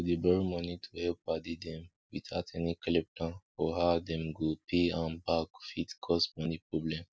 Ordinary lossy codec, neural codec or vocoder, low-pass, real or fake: none; none; none; real